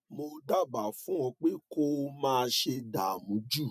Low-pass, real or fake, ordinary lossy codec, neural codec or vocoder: 14.4 kHz; real; none; none